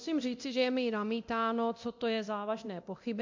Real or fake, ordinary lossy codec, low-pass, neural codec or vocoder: fake; MP3, 48 kbps; 7.2 kHz; codec, 24 kHz, 0.9 kbps, DualCodec